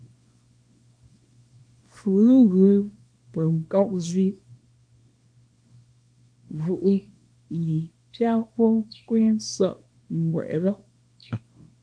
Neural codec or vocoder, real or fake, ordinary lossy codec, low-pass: codec, 24 kHz, 0.9 kbps, WavTokenizer, small release; fake; MP3, 64 kbps; 9.9 kHz